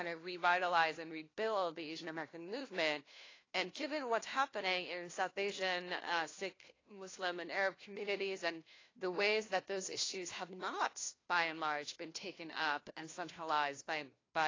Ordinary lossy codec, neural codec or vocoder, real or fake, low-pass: AAC, 32 kbps; codec, 16 kHz, 1 kbps, FunCodec, trained on LibriTTS, 50 frames a second; fake; 7.2 kHz